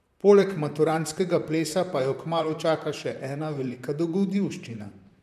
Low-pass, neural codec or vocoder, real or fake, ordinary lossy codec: 14.4 kHz; vocoder, 44.1 kHz, 128 mel bands, Pupu-Vocoder; fake; MP3, 96 kbps